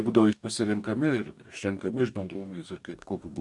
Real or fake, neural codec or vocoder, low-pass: fake; codec, 44.1 kHz, 2.6 kbps, DAC; 10.8 kHz